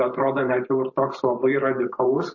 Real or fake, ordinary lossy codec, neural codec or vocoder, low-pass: fake; MP3, 32 kbps; vocoder, 44.1 kHz, 128 mel bands every 512 samples, BigVGAN v2; 7.2 kHz